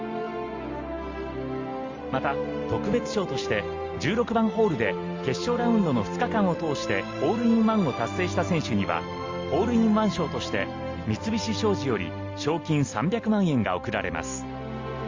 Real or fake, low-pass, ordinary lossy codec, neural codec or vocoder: real; 7.2 kHz; Opus, 32 kbps; none